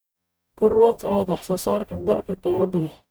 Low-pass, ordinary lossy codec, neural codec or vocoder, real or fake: none; none; codec, 44.1 kHz, 0.9 kbps, DAC; fake